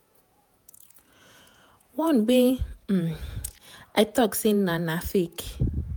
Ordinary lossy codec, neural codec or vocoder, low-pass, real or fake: none; vocoder, 48 kHz, 128 mel bands, Vocos; none; fake